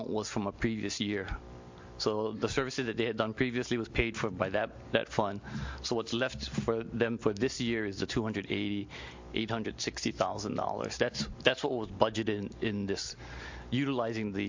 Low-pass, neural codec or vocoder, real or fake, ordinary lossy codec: 7.2 kHz; none; real; MP3, 48 kbps